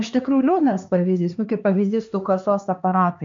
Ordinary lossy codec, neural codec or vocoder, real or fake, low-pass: MP3, 64 kbps; codec, 16 kHz, 2 kbps, X-Codec, HuBERT features, trained on LibriSpeech; fake; 7.2 kHz